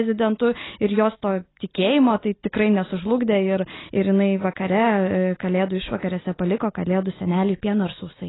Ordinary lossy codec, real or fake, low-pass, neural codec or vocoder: AAC, 16 kbps; real; 7.2 kHz; none